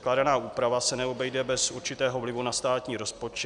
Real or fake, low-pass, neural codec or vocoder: real; 10.8 kHz; none